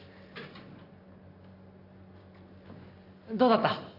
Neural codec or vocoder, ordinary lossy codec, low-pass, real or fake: none; none; 5.4 kHz; real